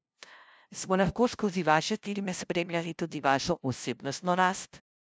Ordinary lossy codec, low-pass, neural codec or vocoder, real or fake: none; none; codec, 16 kHz, 0.5 kbps, FunCodec, trained on LibriTTS, 25 frames a second; fake